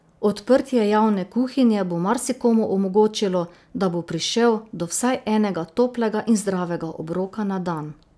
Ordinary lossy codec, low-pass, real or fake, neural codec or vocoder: none; none; real; none